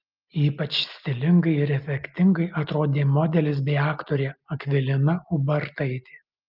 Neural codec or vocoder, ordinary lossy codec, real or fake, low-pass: none; Opus, 32 kbps; real; 5.4 kHz